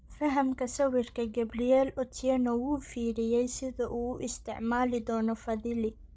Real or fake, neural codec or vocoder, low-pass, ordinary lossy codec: fake; codec, 16 kHz, 16 kbps, FreqCodec, larger model; none; none